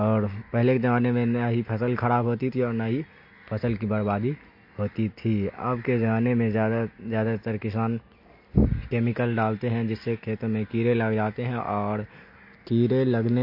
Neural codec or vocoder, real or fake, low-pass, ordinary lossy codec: none; real; 5.4 kHz; MP3, 32 kbps